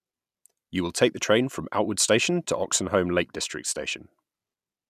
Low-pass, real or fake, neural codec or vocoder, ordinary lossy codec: 14.4 kHz; real; none; none